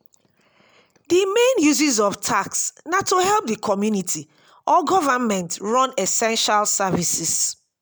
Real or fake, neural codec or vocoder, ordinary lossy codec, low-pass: real; none; none; none